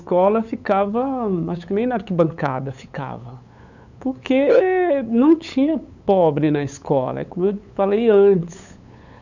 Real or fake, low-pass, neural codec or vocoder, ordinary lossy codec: fake; 7.2 kHz; codec, 16 kHz, 8 kbps, FunCodec, trained on LibriTTS, 25 frames a second; none